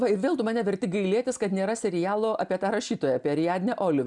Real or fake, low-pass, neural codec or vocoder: real; 10.8 kHz; none